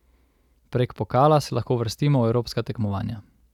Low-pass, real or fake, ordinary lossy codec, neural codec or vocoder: 19.8 kHz; real; none; none